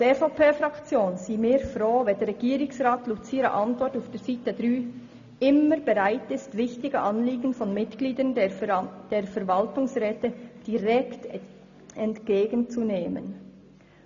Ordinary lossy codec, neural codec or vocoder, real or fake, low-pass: none; none; real; 7.2 kHz